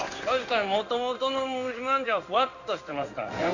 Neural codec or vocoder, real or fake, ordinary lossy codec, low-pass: codec, 16 kHz in and 24 kHz out, 1 kbps, XY-Tokenizer; fake; none; 7.2 kHz